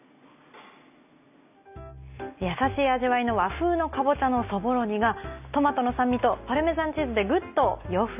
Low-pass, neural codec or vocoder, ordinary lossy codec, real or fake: 3.6 kHz; none; none; real